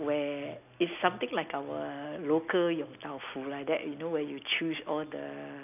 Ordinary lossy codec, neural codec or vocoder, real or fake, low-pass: none; none; real; 3.6 kHz